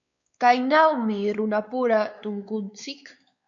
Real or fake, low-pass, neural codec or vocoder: fake; 7.2 kHz; codec, 16 kHz, 4 kbps, X-Codec, WavLM features, trained on Multilingual LibriSpeech